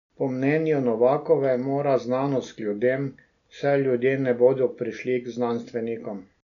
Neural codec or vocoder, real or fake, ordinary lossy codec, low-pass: none; real; none; 7.2 kHz